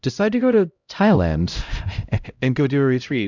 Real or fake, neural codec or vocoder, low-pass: fake; codec, 16 kHz, 0.5 kbps, X-Codec, HuBERT features, trained on LibriSpeech; 7.2 kHz